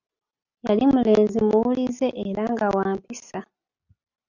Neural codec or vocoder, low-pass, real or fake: none; 7.2 kHz; real